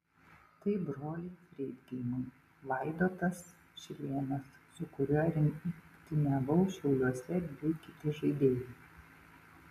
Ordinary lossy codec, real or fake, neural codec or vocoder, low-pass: MP3, 96 kbps; real; none; 14.4 kHz